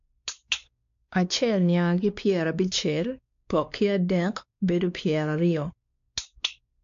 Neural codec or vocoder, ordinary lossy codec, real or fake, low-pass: codec, 16 kHz, 2 kbps, X-Codec, WavLM features, trained on Multilingual LibriSpeech; AAC, 64 kbps; fake; 7.2 kHz